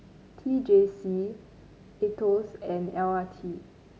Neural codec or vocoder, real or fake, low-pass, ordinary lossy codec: none; real; none; none